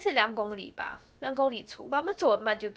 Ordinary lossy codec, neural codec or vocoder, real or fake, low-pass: none; codec, 16 kHz, about 1 kbps, DyCAST, with the encoder's durations; fake; none